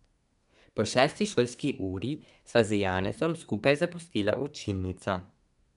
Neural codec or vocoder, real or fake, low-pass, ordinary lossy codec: codec, 24 kHz, 1 kbps, SNAC; fake; 10.8 kHz; none